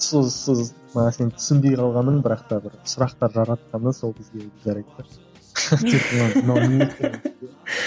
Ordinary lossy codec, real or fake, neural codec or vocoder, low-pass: none; real; none; none